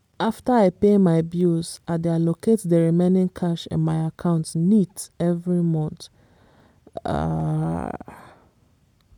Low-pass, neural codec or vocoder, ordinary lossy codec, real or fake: 19.8 kHz; none; MP3, 96 kbps; real